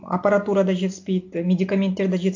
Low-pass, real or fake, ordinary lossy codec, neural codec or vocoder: 7.2 kHz; real; AAC, 48 kbps; none